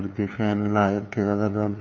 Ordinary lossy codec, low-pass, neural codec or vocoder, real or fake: MP3, 32 kbps; 7.2 kHz; codec, 16 kHz, 2 kbps, FunCodec, trained on LibriTTS, 25 frames a second; fake